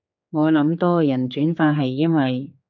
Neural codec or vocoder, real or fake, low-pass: codec, 16 kHz, 4 kbps, X-Codec, HuBERT features, trained on general audio; fake; 7.2 kHz